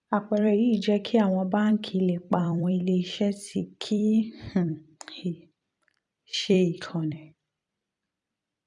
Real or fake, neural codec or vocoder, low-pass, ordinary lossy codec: fake; vocoder, 44.1 kHz, 128 mel bands every 256 samples, BigVGAN v2; 10.8 kHz; none